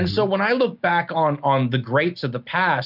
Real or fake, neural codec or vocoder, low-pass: real; none; 5.4 kHz